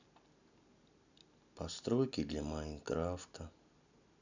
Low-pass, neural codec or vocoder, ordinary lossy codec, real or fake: 7.2 kHz; none; none; real